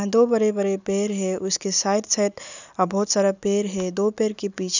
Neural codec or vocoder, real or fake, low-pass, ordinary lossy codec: none; real; 7.2 kHz; none